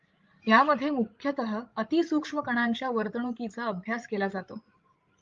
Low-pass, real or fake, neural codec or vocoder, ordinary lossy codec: 7.2 kHz; fake; codec, 16 kHz, 16 kbps, FreqCodec, larger model; Opus, 32 kbps